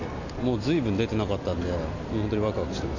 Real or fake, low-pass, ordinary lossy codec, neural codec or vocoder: real; 7.2 kHz; none; none